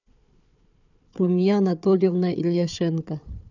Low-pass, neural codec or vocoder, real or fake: 7.2 kHz; codec, 16 kHz, 4 kbps, FunCodec, trained on Chinese and English, 50 frames a second; fake